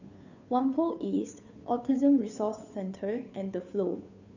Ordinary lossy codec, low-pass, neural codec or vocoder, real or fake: none; 7.2 kHz; codec, 16 kHz, 2 kbps, FunCodec, trained on Chinese and English, 25 frames a second; fake